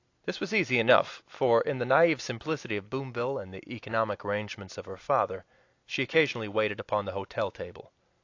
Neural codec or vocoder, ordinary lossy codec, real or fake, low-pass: none; AAC, 48 kbps; real; 7.2 kHz